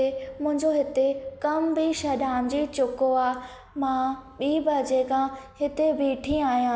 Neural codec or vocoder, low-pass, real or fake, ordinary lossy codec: none; none; real; none